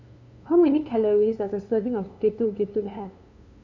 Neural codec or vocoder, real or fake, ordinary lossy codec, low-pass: codec, 16 kHz, 2 kbps, FunCodec, trained on LibriTTS, 25 frames a second; fake; none; 7.2 kHz